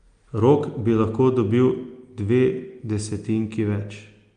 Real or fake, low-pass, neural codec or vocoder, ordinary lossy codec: real; 9.9 kHz; none; Opus, 32 kbps